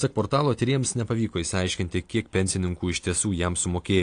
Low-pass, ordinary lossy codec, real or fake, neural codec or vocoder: 9.9 kHz; AAC, 48 kbps; real; none